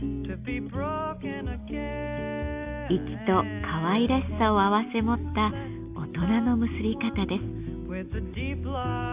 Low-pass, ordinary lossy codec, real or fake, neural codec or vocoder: 3.6 kHz; Opus, 64 kbps; real; none